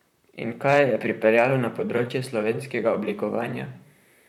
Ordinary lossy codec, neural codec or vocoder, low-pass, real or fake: none; vocoder, 44.1 kHz, 128 mel bands, Pupu-Vocoder; 19.8 kHz; fake